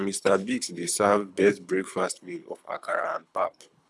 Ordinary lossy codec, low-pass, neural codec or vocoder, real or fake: none; none; codec, 24 kHz, 3 kbps, HILCodec; fake